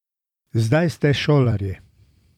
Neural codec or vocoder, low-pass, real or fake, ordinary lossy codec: vocoder, 44.1 kHz, 128 mel bands every 256 samples, BigVGAN v2; 19.8 kHz; fake; none